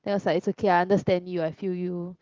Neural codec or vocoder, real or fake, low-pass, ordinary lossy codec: none; real; 7.2 kHz; Opus, 16 kbps